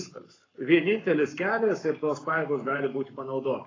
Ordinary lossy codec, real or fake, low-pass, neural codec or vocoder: AAC, 32 kbps; fake; 7.2 kHz; codec, 44.1 kHz, 7.8 kbps, Pupu-Codec